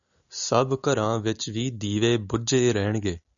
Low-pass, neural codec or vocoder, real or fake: 7.2 kHz; none; real